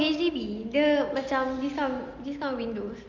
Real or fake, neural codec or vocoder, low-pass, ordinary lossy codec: real; none; 7.2 kHz; Opus, 32 kbps